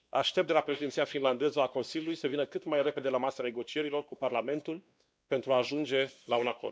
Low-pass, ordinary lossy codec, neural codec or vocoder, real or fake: none; none; codec, 16 kHz, 2 kbps, X-Codec, WavLM features, trained on Multilingual LibriSpeech; fake